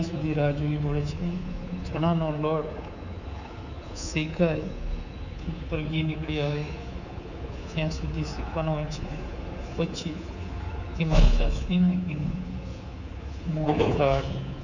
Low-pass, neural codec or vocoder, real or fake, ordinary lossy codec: 7.2 kHz; codec, 24 kHz, 3.1 kbps, DualCodec; fake; none